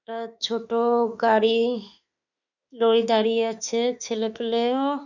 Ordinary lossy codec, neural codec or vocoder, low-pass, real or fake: none; autoencoder, 48 kHz, 32 numbers a frame, DAC-VAE, trained on Japanese speech; 7.2 kHz; fake